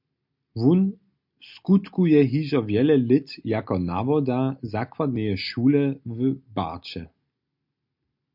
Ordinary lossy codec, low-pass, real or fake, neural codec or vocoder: MP3, 48 kbps; 5.4 kHz; real; none